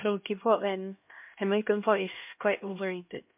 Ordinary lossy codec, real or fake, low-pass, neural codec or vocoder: MP3, 32 kbps; fake; 3.6 kHz; codec, 16 kHz, 1 kbps, X-Codec, HuBERT features, trained on LibriSpeech